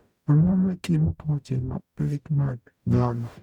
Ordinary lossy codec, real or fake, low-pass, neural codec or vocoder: none; fake; 19.8 kHz; codec, 44.1 kHz, 0.9 kbps, DAC